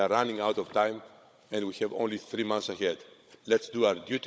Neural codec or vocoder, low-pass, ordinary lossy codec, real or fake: codec, 16 kHz, 16 kbps, FunCodec, trained on Chinese and English, 50 frames a second; none; none; fake